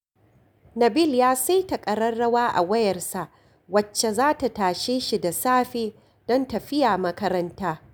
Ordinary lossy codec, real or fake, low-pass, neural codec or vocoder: none; real; none; none